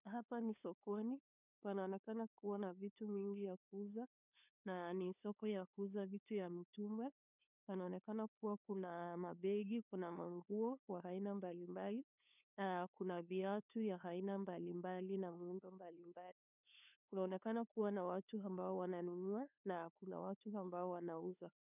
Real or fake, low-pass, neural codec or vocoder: fake; 3.6 kHz; codec, 16 kHz, 2 kbps, FunCodec, trained on LibriTTS, 25 frames a second